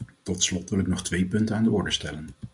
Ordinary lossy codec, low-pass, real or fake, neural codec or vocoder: MP3, 64 kbps; 10.8 kHz; real; none